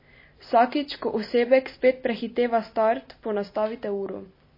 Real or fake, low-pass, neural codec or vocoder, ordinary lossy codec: real; 5.4 kHz; none; MP3, 24 kbps